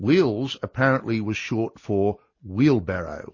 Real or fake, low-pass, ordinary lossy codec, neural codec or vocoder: real; 7.2 kHz; MP3, 32 kbps; none